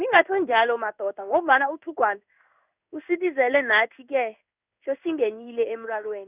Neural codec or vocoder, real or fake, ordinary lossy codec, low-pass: codec, 16 kHz in and 24 kHz out, 1 kbps, XY-Tokenizer; fake; none; 3.6 kHz